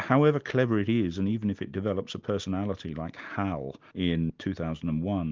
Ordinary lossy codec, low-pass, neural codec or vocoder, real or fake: Opus, 24 kbps; 7.2 kHz; none; real